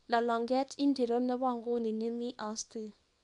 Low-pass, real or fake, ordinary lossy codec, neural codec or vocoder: 10.8 kHz; fake; none; codec, 24 kHz, 0.9 kbps, WavTokenizer, small release